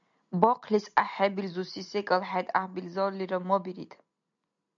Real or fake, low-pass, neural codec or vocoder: real; 7.2 kHz; none